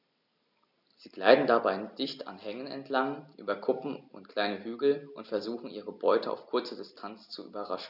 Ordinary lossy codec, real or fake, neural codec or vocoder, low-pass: none; real; none; 5.4 kHz